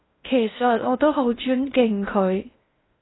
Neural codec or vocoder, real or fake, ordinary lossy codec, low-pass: codec, 16 kHz in and 24 kHz out, 0.6 kbps, FocalCodec, streaming, 2048 codes; fake; AAC, 16 kbps; 7.2 kHz